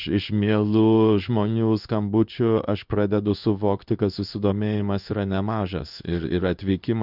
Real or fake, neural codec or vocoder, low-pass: fake; codec, 16 kHz in and 24 kHz out, 1 kbps, XY-Tokenizer; 5.4 kHz